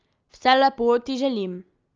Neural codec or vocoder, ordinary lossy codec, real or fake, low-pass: none; Opus, 24 kbps; real; 7.2 kHz